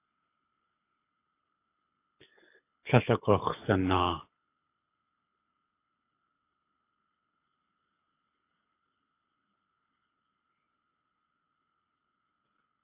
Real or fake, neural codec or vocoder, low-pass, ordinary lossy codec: fake; codec, 24 kHz, 6 kbps, HILCodec; 3.6 kHz; AAC, 24 kbps